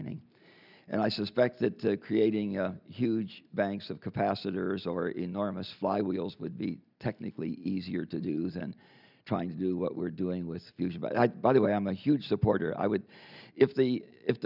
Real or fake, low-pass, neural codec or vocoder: real; 5.4 kHz; none